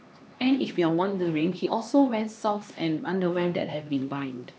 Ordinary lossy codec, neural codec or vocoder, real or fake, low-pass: none; codec, 16 kHz, 2 kbps, X-Codec, HuBERT features, trained on LibriSpeech; fake; none